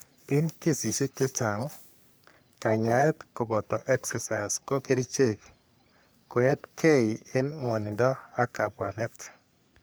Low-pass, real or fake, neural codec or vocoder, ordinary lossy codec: none; fake; codec, 44.1 kHz, 3.4 kbps, Pupu-Codec; none